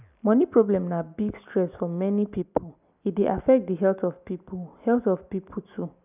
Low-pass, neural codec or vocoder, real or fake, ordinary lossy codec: 3.6 kHz; none; real; none